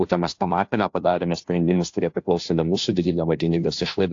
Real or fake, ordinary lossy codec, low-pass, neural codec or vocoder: fake; AAC, 48 kbps; 7.2 kHz; codec, 16 kHz, 0.5 kbps, FunCodec, trained on Chinese and English, 25 frames a second